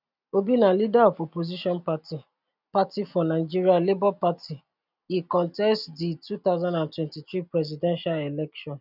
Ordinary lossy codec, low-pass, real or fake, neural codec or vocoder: none; 5.4 kHz; real; none